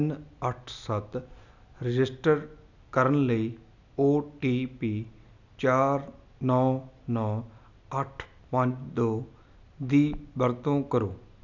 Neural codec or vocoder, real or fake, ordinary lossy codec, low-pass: none; real; none; 7.2 kHz